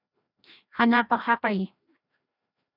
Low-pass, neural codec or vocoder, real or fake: 5.4 kHz; codec, 16 kHz, 1 kbps, FreqCodec, larger model; fake